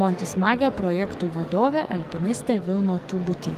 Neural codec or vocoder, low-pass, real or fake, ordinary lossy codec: codec, 44.1 kHz, 2.6 kbps, SNAC; 14.4 kHz; fake; Opus, 32 kbps